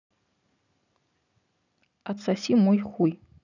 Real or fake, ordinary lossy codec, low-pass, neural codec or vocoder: real; none; 7.2 kHz; none